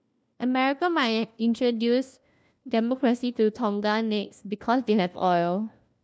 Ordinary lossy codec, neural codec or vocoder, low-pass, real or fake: none; codec, 16 kHz, 1 kbps, FunCodec, trained on LibriTTS, 50 frames a second; none; fake